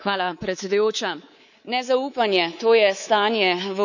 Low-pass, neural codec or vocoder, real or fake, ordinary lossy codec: 7.2 kHz; codec, 24 kHz, 3.1 kbps, DualCodec; fake; none